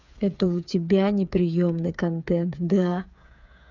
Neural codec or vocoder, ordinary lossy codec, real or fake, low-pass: codec, 16 kHz, 8 kbps, FreqCodec, smaller model; none; fake; 7.2 kHz